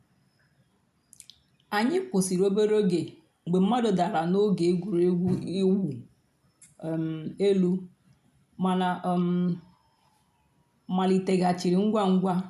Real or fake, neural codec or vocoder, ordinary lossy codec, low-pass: real; none; none; 14.4 kHz